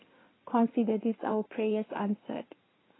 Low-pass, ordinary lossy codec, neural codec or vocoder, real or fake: 7.2 kHz; AAC, 16 kbps; codec, 16 kHz in and 24 kHz out, 2.2 kbps, FireRedTTS-2 codec; fake